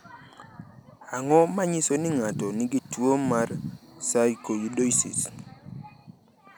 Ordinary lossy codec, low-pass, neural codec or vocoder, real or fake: none; none; none; real